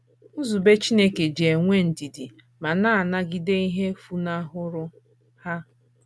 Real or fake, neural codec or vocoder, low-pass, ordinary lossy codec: real; none; none; none